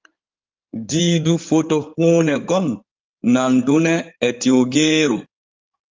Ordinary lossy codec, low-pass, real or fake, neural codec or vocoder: Opus, 32 kbps; 7.2 kHz; fake; codec, 16 kHz in and 24 kHz out, 2.2 kbps, FireRedTTS-2 codec